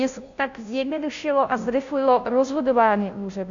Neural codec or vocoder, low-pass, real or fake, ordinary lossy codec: codec, 16 kHz, 0.5 kbps, FunCodec, trained on Chinese and English, 25 frames a second; 7.2 kHz; fake; AAC, 64 kbps